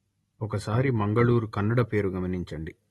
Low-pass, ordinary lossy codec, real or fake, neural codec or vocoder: 14.4 kHz; AAC, 32 kbps; real; none